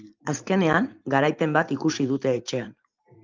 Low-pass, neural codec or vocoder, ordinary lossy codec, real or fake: 7.2 kHz; vocoder, 44.1 kHz, 128 mel bands every 512 samples, BigVGAN v2; Opus, 24 kbps; fake